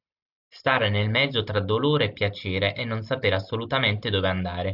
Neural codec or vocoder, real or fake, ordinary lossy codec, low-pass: none; real; AAC, 48 kbps; 5.4 kHz